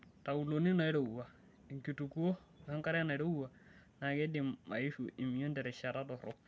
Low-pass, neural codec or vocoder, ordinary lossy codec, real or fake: none; none; none; real